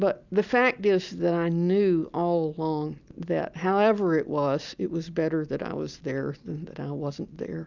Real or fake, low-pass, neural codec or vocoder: real; 7.2 kHz; none